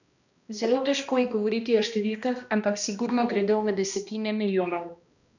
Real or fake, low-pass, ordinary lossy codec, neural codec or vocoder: fake; 7.2 kHz; none; codec, 16 kHz, 1 kbps, X-Codec, HuBERT features, trained on balanced general audio